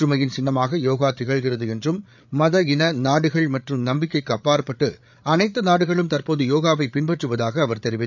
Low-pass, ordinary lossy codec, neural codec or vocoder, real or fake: 7.2 kHz; none; codec, 16 kHz, 8 kbps, FreqCodec, larger model; fake